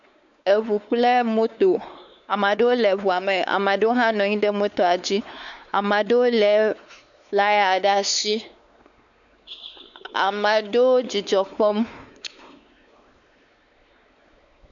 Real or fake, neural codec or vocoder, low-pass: fake; codec, 16 kHz, 4 kbps, X-Codec, WavLM features, trained on Multilingual LibriSpeech; 7.2 kHz